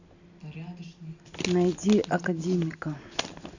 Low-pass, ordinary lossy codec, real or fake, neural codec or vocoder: 7.2 kHz; none; real; none